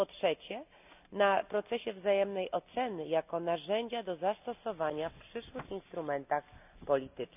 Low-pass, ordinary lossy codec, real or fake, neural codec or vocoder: 3.6 kHz; none; real; none